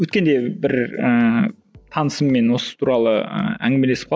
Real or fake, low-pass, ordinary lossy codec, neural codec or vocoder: real; none; none; none